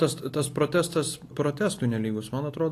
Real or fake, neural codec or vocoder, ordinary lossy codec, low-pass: real; none; AAC, 48 kbps; 14.4 kHz